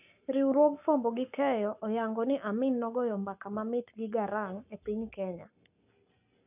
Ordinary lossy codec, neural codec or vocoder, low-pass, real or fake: none; codec, 44.1 kHz, 7.8 kbps, Pupu-Codec; 3.6 kHz; fake